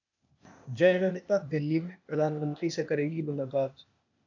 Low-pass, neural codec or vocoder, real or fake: 7.2 kHz; codec, 16 kHz, 0.8 kbps, ZipCodec; fake